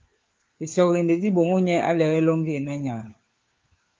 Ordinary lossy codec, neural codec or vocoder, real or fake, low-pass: Opus, 24 kbps; codec, 16 kHz, 4 kbps, FunCodec, trained on LibriTTS, 50 frames a second; fake; 7.2 kHz